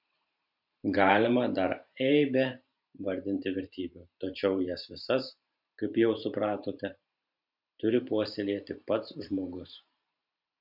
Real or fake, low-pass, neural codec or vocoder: fake; 5.4 kHz; vocoder, 44.1 kHz, 128 mel bands every 512 samples, BigVGAN v2